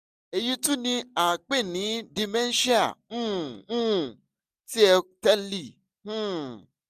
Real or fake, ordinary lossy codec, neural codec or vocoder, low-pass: real; none; none; 14.4 kHz